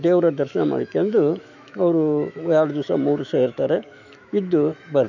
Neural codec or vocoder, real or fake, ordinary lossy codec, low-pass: autoencoder, 48 kHz, 128 numbers a frame, DAC-VAE, trained on Japanese speech; fake; none; 7.2 kHz